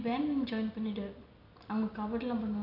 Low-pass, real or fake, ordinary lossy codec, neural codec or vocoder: 5.4 kHz; real; none; none